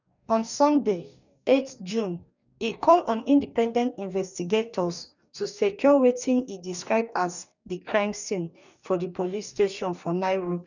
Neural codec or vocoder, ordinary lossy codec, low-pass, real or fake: codec, 44.1 kHz, 2.6 kbps, DAC; none; 7.2 kHz; fake